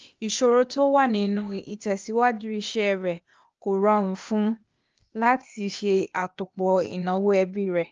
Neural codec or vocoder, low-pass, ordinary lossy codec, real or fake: codec, 16 kHz, 0.8 kbps, ZipCodec; 7.2 kHz; Opus, 24 kbps; fake